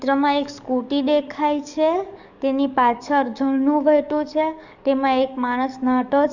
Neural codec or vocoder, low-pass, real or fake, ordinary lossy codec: codec, 44.1 kHz, 7.8 kbps, DAC; 7.2 kHz; fake; none